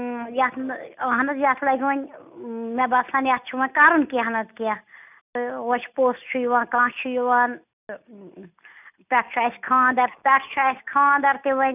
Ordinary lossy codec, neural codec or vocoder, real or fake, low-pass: none; none; real; 3.6 kHz